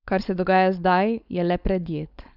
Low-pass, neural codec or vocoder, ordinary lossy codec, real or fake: 5.4 kHz; codec, 16 kHz, 4.8 kbps, FACodec; none; fake